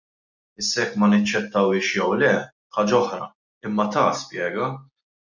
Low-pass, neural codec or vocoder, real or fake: 7.2 kHz; none; real